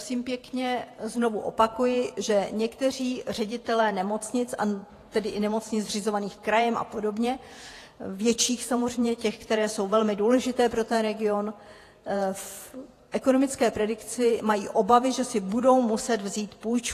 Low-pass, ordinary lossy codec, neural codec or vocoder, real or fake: 14.4 kHz; AAC, 48 kbps; vocoder, 48 kHz, 128 mel bands, Vocos; fake